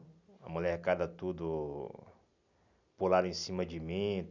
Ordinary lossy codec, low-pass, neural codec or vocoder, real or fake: none; 7.2 kHz; none; real